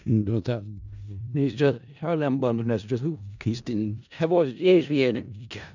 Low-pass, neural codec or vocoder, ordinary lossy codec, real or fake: 7.2 kHz; codec, 16 kHz in and 24 kHz out, 0.4 kbps, LongCat-Audio-Codec, four codebook decoder; none; fake